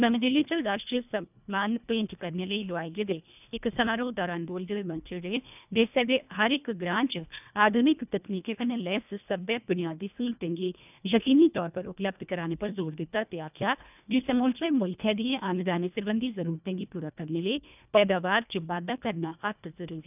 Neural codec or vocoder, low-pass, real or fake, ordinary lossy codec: codec, 24 kHz, 1.5 kbps, HILCodec; 3.6 kHz; fake; none